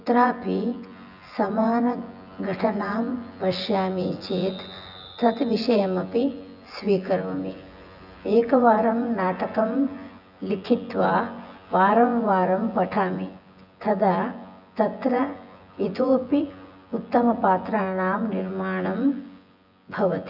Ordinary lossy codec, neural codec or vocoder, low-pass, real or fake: none; vocoder, 24 kHz, 100 mel bands, Vocos; 5.4 kHz; fake